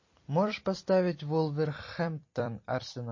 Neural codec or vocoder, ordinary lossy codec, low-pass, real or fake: vocoder, 44.1 kHz, 128 mel bands every 256 samples, BigVGAN v2; MP3, 32 kbps; 7.2 kHz; fake